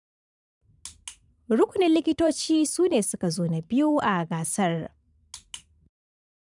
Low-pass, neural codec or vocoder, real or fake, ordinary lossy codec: 10.8 kHz; vocoder, 44.1 kHz, 128 mel bands every 512 samples, BigVGAN v2; fake; none